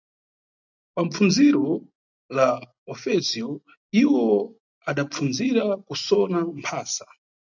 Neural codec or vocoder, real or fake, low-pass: none; real; 7.2 kHz